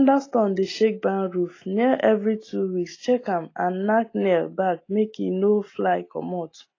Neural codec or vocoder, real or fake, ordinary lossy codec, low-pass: none; real; AAC, 32 kbps; 7.2 kHz